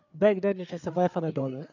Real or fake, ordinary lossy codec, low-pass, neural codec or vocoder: fake; none; 7.2 kHz; codec, 16 kHz, 4 kbps, FreqCodec, larger model